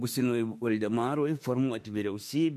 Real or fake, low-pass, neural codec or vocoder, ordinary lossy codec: fake; 14.4 kHz; autoencoder, 48 kHz, 32 numbers a frame, DAC-VAE, trained on Japanese speech; MP3, 64 kbps